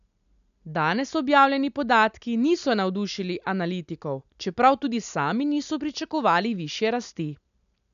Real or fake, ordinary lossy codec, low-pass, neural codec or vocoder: real; none; 7.2 kHz; none